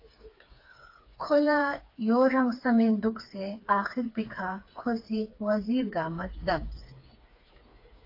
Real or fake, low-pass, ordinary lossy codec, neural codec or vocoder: fake; 5.4 kHz; AAC, 32 kbps; codec, 16 kHz, 4 kbps, FreqCodec, smaller model